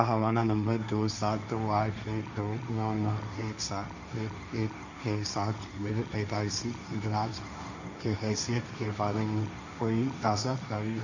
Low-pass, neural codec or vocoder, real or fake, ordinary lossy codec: 7.2 kHz; codec, 16 kHz, 1.1 kbps, Voila-Tokenizer; fake; none